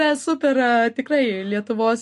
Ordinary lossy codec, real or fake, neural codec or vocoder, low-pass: MP3, 48 kbps; real; none; 14.4 kHz